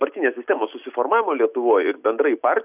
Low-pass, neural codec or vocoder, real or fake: 3.6 kHz; none; real